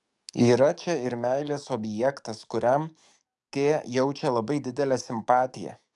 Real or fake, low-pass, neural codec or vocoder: fake; 10.8 kHz; codec, 44.1 kHz, 7.8 kbps, DAC